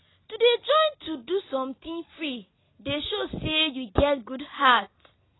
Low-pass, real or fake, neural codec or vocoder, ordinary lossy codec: 7.2 kHz; real; none; AAC, 16 kbps